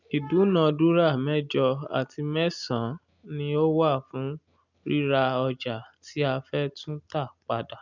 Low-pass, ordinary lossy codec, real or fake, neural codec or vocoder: 7.2 kHz; none; real; none